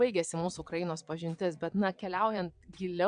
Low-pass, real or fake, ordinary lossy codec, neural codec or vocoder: 9.9 kHz; fake; AAC, 64 kbps; vocoder, 22.05 kHz, 80 mel bands, Vocos